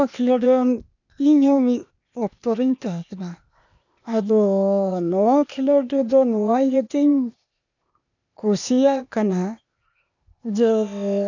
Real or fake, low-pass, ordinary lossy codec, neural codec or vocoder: fake; 7.2 kHz; none; codec, 16 kHz, 0.8 kbps, ZipCodec